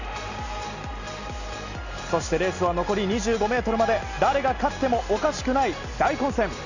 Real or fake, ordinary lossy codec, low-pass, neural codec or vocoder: real; none; 7.2 kHz; none